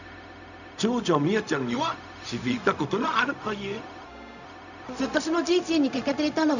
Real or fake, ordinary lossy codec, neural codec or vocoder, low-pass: fake; none; codec, 16 kHz, 0.4 kbps, LongCat-Audio-Codec; 7.2 kHz